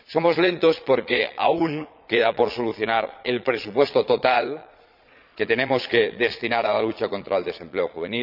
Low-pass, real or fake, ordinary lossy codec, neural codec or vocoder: 5.4 kHz; fake; none; vocoder, 22.05 kHz, 80 mel bands, Vocos